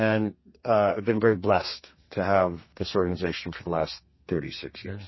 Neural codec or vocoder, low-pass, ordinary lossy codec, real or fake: codec, 32 kHz, 1.9 kbps, SNAC; 7.2 kHz; MP3, 24 kbps; fake